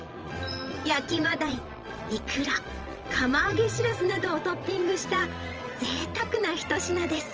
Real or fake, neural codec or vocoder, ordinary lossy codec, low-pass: fake; vocoder, 22.05 kHz, 80 mel bands, Vocos; Opus, 24 kbps; 7.2 kHz